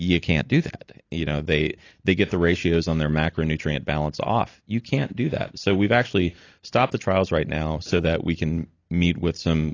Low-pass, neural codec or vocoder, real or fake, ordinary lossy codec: 7.2 kHz; none; real; AAC, 32 kbps